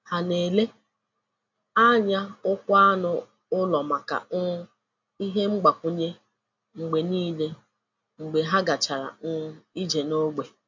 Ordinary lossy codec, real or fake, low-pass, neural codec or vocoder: MP3, 64 kbps; real; 7.2 kHz; none